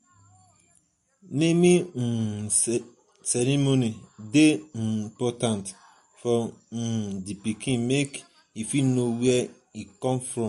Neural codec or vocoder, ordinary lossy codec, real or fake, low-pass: none; MP3, 48 kbps; real; 14.4 kHz